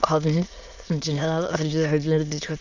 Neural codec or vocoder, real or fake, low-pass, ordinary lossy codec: autoencoder, 22.05 kHz, a latent of 192 numbers a frame, VITS, trained on many speakers; fake; 7.2 kHz; Opus, 64 kbps